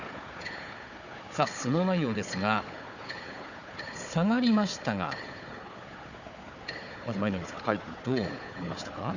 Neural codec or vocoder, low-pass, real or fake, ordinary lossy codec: codec, 16 kHz, 4 kbps, FunCodec, trained on Chinese and English, 50 frames a second; 7.2 kHz; fake; none